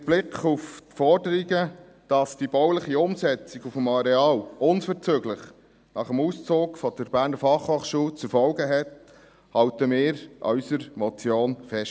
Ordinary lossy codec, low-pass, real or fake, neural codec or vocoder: none; none; real; none